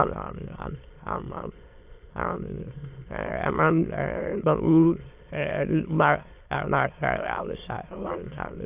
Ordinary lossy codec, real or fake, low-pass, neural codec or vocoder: AAC, 32 kbps; fake; 3.6 kHz; autoencoder, 22.05 kHz, a latent of 192 numbers a frame, VITS, trained on many speakers